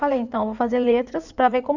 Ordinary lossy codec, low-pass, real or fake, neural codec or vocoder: none; 7.2 kHz; fake; codec, 16 kHz in and 24 kHz out, 2.2 kbps, FireRedTTS-2 codec